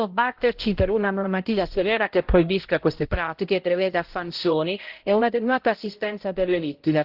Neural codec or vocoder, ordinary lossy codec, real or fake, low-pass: codec, 16 kHz, 0.5 kbps, X-Codec, HuBERT features, trained on balanced general audio; Opus, 16 kbps; fake; 5.4 kHz